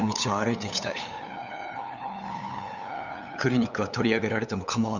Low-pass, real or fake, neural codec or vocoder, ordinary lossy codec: 7.2 kHz; fake; codec, 16 kHz, 8 kbps, FunCodec, trained on LibriTTS, 25 frames a second; none